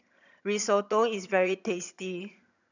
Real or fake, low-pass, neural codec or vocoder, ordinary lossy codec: fake; 7.2 kHz; vocoder, 22.05 kHz, 80 mel bands, HiFi-GAN; none